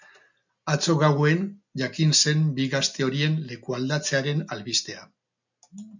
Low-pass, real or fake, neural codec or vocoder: 7.2 kHz; real; none